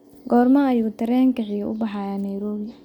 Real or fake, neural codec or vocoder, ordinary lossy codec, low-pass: real; none; none; 19.8 kHz